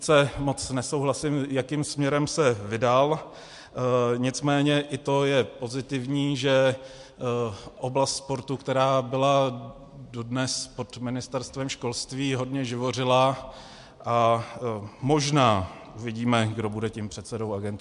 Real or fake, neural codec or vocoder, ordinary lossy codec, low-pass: real; none; MP3, 64 kbps; 10.8 kHz